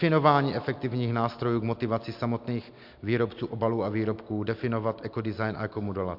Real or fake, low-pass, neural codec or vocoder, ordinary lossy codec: real; 5.4 kHz; none; MP3, 48 kbps